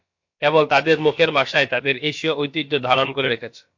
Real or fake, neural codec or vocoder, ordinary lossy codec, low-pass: fake; codec, 16 kHz, about 1 kbps, DyCAST, with the encoder's durations; MP3, 48 kbps; 7.2 kHz